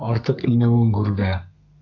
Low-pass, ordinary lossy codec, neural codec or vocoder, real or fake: 7.2 kHz; MP3, 64 kbps; codec, 32 kHz, 1.9 kbps, SNAC; fake